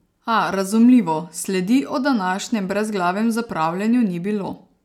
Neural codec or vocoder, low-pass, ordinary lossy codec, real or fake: none; 19.8 kHz; none; real